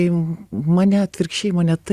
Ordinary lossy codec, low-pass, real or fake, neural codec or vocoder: Opus, 64 kbps; 14.4 kHz; real; none